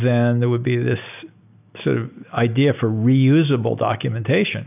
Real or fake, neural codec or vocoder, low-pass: real; none; 3.6 kHz